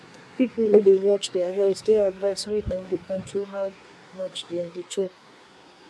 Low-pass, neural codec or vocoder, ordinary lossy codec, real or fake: none; codec, 24 kHz, 1 kbps, SNAC; none; fake